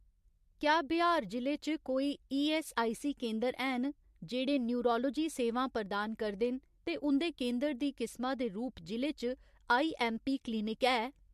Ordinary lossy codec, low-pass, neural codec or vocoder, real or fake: MP3, 64 kbps; 14.4 kHz; none; real